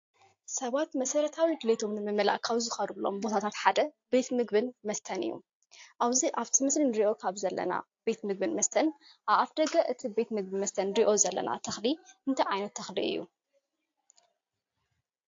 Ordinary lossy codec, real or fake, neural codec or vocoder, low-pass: AAC, 48 kbps; real; none; 7.2 kHz